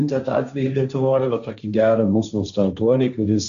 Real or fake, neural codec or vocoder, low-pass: fake; codec, 16 kHz, 1.1 kbps, Voila-Tokenizer; 7.2 kHz